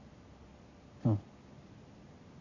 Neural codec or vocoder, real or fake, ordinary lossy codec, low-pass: none; real; AAC, 48 kbps; 7.2 kHz